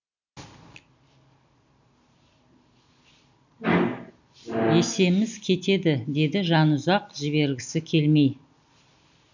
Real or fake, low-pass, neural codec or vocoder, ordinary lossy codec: real; 7.2 kHz; none; none